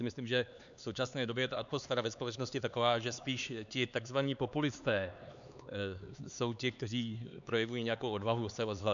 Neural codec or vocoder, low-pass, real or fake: codec, 16 kHz, 4 kbps, X-Codec, HuBERT features, trained on LibriSpeech; 7.2 kHz; fake